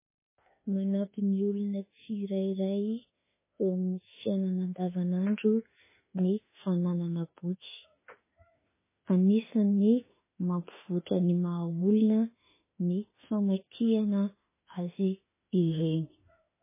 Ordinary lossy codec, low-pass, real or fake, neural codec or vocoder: MP3, 16 kbps; 3.6 kHz; fake; autoencoder, 48 kHz, 32 numbers a frame, DAC-VAE, trained on Japanese speech